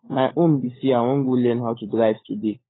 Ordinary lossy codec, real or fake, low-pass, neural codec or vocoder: AAC, 16 kbps; fake; 7.2 kHz; codec, 16 kHz, 16 kbps, FunCodec, trained on LibriTTS, 50 frames a second